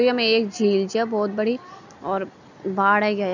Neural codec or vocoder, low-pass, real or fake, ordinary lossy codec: none; 7.2 kHz; real; none